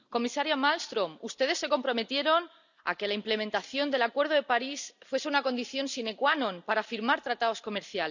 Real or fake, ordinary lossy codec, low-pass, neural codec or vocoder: real; none; 7.2 kHz; none